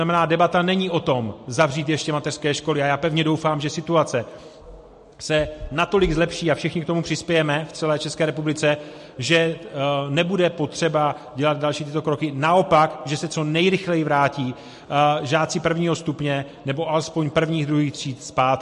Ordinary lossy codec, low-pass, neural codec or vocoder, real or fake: MP3, 48 kbps; 14.4 kHz; none; real